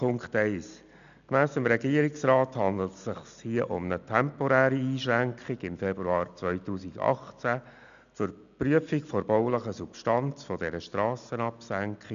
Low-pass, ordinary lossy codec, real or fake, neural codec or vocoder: 7.2 kHz; none; real; none